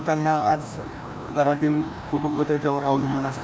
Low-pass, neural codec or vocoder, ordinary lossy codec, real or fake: none; codec, 16 kHz, 1 kbps, FreqCodec, larger model; none; fake